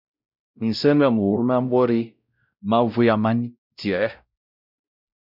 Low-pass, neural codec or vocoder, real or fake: 5.4 kHz; codec, 16 kHz, 0.5 kbps, X-Codec, WavLM features, trained on Multilingual LibriSpeech; fake